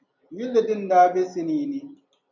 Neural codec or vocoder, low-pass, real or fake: none; 7.2 kHz; real